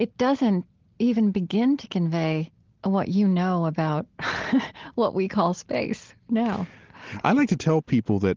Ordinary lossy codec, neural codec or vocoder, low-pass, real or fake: Opus, 16 kbps; none; 7.2 kHz; real